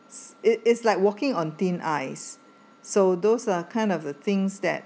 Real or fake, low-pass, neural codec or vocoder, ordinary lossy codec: real; none; none; none